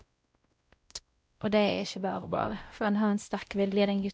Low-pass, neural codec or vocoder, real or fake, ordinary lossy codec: none; codec, 16 kHz, 0.5 kbps, X-Codec, WavLM features, trained on Multilingual LibriSpeech; fake; none